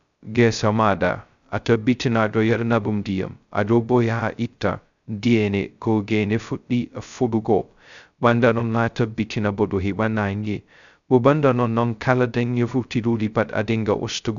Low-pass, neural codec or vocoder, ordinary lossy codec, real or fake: 7.2 kHz; codec, 16 kHz, 0.2 kbps, FocalCodec; none; fake